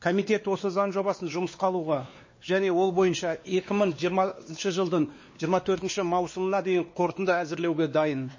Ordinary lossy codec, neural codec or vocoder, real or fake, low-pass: MP3, 32 kbps; codec, 16 kHz, 2 kbps, X-Codec, WavLM features, trained on Multilingual LibriSpeech; fake; 7.2 kHz